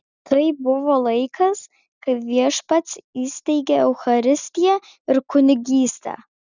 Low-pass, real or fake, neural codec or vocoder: 7.2 kHz; real; none